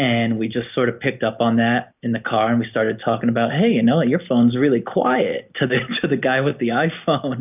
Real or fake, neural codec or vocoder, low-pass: real; none; 3.6 kHz